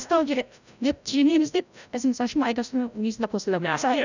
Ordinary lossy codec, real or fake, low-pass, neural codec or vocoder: none; fake; 7.2 kHz; codec, 16 kHz, 0.5 kbps, FreqCodec, larger model